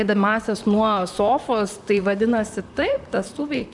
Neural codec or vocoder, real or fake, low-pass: vocoder, 44.1 kHz, 128 mel bands, Pupu-Vocoder; fake; 10.8 kHz